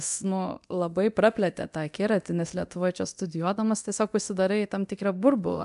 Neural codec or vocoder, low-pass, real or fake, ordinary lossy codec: codec, 24 kHz, 0.9 kbps, DualCodec; 10.8 kHz; fake; MP3, 96 kbps